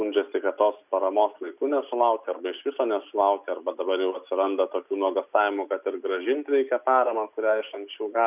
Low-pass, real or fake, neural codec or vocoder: 3.6 kHz; real; none